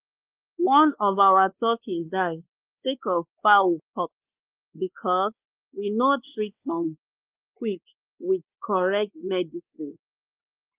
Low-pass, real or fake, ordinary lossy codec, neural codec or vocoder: 3.6 kHz; fake; Opus, 32 kbps; codec, 16 kHz, 2 kbps, X-Codec, WavLM features, trained on Multilingual LibriSpeech